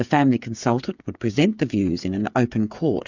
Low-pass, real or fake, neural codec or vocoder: 7.2 kHz; fake; codec, 16 kHz, 8 kbps, FreqCodec, smaller model